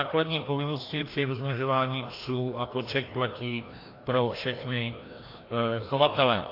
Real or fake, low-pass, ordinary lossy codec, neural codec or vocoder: fake; 5.4 kHz; AAC, 32 kbps; codec, 16 kHz, 1 kbps, FreqCodec, larger model